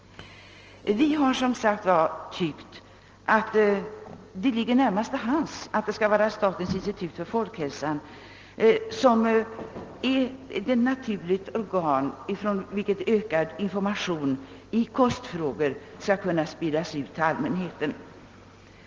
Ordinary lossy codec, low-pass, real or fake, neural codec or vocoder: Opus, 16 kbps; 7.2 kHz; real; none